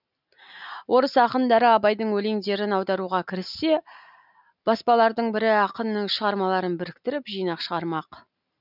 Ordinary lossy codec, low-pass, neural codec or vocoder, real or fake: none; 5.4 kHz; none; real